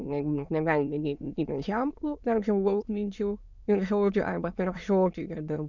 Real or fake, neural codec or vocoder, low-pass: fake; autoencoder, 22.05 kHz, a latent of 192 numbers a frame, VITS, trained on many speakers; 7.2 kHz